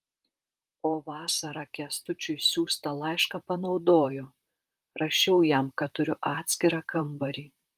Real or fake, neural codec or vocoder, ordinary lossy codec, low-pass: fake; vocoder, 44.1 kHz, 128 mel bands, Pupu-Vocoder; Opus, 32 kbps; 14.4 kHz